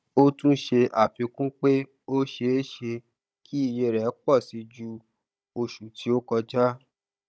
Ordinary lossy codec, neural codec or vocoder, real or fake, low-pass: none; codec, 16 kHz, 16 kbps, FunCodec, trained on Chinese and English, 50 frames a second; fake; none